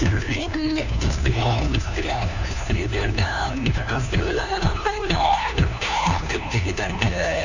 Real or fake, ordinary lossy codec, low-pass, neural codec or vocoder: fake; none; 7.2 kHz; codec, 16 kHz, 1 kbps, FunCodec, trained on LibriTTS, 50 frames a second